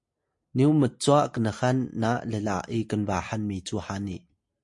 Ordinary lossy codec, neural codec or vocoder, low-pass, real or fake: MP3, 48 kbps; none; 10.8 kHz; real